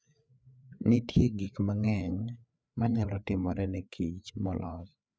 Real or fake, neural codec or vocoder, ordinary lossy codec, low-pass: fake; codec, 16 kHz, 4 kbps, FreqCodec, larger model; none; none